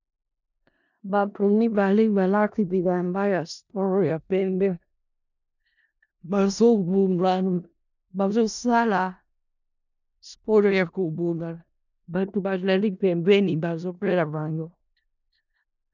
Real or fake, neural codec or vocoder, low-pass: fake; codec, 16 kHz in and 24 kHz out, 0.4 kbps, LongCat-Audio-Codec, four codebook decoder; 7.2 kHz